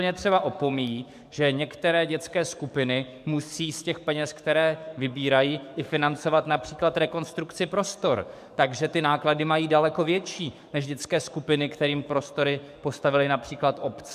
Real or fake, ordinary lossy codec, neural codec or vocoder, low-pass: fake; MP3, 96 kbps; codec, 44.1 kHz, 7.8 kbps, DAC; 14.4 kHz